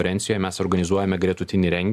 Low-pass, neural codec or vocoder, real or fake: 14.4 kHz; none; real